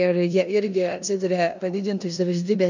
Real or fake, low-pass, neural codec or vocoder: fake; 7.2 kHz; codec, 16 kHz in and 24 kHz out, 0.9 kbps, LongCat-Audio-Codec, four codebook decoder